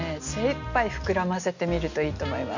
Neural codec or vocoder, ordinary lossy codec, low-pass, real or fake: none; none; 7.2 kHz; real